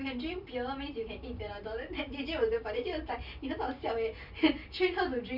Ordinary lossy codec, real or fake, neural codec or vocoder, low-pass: none; real; none; 5.4 kHz